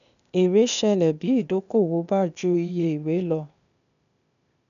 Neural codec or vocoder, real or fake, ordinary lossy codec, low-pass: codec, 16 kHz, 0.8 kbps, ZipCodec; fake; none; 7.2 kHz